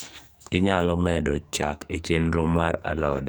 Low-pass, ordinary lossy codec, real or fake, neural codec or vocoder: none; none; fake; codec, 44.1 kHz, 2.6 kbps, SNAC